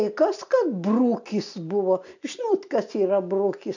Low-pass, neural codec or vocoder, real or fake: 7.2 kHz; none; real